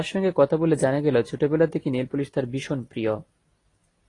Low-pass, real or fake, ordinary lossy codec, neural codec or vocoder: 10.8 kHz; real; AAC, 32 kbps; none